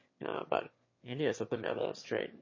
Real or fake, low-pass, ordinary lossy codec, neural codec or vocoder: fake; 7.2 kHz; MP3, 32 kbps; autoencoder, 22.05 kHz, a latent of 192 numbers a frame, VITS, trained on one speaker